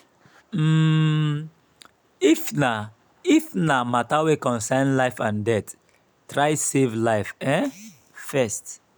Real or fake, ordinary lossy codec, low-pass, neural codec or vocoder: real; none; none; none